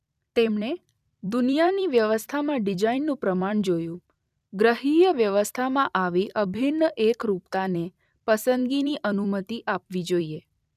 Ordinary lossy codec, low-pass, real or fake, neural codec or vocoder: none; 14.4 kHz; fake; vocoder, 44.1 kHz, 128 mel bands every 256 samples, BigVGAN v2